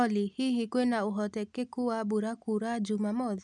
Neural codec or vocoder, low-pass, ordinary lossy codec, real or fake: none; 10.8 kHz; none; real